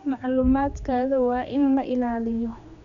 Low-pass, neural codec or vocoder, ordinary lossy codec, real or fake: 7.2 kHz; codec, 16 kHz, 2 kbps, X-Codec, HuBERT features, trained on general audio; none; fake